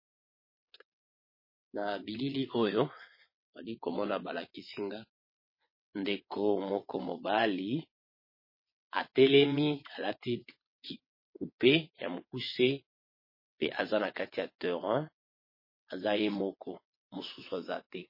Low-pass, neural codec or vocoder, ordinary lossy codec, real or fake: 5.4 kHz; vocoder, 22.05 kHz, 80 mel bands, WaveNeXt; MP3, 24 kbps; fake